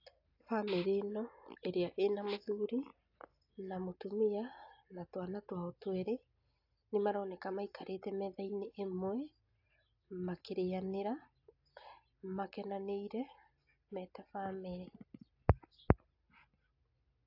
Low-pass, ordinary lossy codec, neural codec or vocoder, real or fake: 5.4 kHz; AAC, 48 kbps; none; real